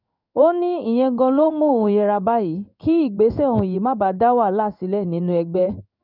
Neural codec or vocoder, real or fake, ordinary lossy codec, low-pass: codec, 16 kHz in and 24 kHz out, 1 kbps, XY-Tokenizer; fake; none; 5.4 kHz